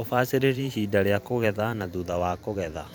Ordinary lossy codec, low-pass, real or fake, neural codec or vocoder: none; none; fake; vocoder, 44.1 kHz, 128 mel bands every 512 samples, BigVGAN v2